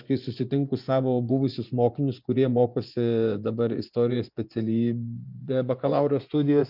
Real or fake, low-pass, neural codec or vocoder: fake; 5.4 kHz; vocoder, 24 kHz, 100 mel bands, Vocos